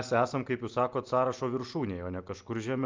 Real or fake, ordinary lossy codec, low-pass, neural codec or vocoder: real; Opus, 32 kbps; 7.2 kHz; none